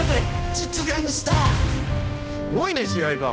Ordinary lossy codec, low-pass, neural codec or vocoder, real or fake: none; none; codec, 16 kHz, 1 kbps, X-Codec, HuBERT features, trained on balanced general audio; fake